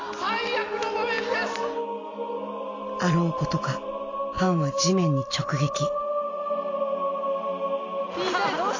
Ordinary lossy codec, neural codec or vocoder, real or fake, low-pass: AAC, 32 kbps; none; real; 7.2 kHz